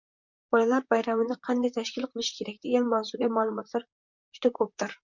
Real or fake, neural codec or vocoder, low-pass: fake; vocoder, 44.1 kHz, 128 mel bands, Pupu-Vocoder; 7.2 kHz